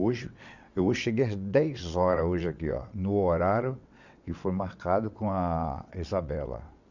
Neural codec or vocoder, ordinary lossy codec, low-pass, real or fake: vocoder, 22.05 kHz, 80 mel bands, WaveNeXt; none; 7.2 kHz; fake